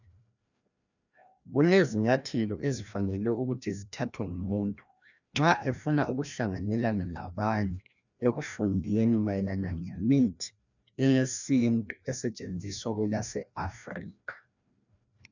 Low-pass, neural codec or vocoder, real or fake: 7.2 kHz; codec, 16 kHz, 1 kbps, FreqCodec, larger model; fake